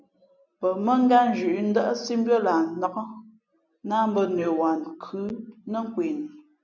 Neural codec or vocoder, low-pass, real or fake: none; 7.2 kHz; real